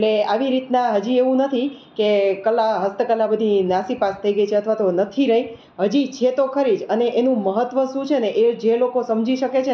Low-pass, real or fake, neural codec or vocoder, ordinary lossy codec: none; real; none; none